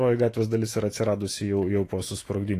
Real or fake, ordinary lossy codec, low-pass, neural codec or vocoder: fake; AAC, 48 kbps; 14.4 kHz; autoencoder, 48 kHz, 128 numbers a frame, DAC-VAE, trained on Japanese speech